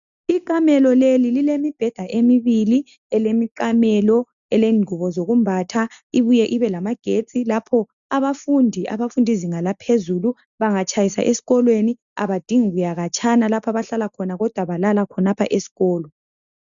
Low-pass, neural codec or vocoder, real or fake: 7.2 kHz; none; real